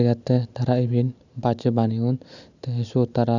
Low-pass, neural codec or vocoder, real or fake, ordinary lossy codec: 7.2 kHz; none; real; none